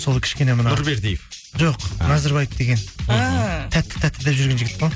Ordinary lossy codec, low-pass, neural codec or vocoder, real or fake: none; none; none; real